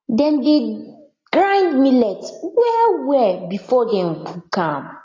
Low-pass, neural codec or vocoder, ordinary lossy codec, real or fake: 7.2 kHz; none; AAC, 32 kbps; real